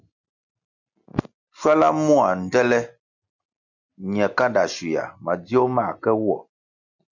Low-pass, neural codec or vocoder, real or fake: 7.2 kHz; none; real